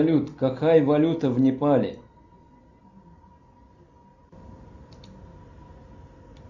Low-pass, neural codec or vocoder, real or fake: 7.2 kHz; none; real